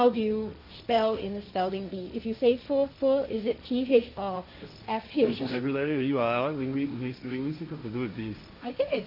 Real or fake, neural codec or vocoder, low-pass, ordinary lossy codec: fake; codec, 16 kHz, 1.1 kbps, Voila-Tokenizer; 5.4 kHz; none